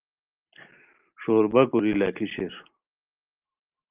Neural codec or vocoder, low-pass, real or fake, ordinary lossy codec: none; 3.6 kHz; real; Opus, 32 kbps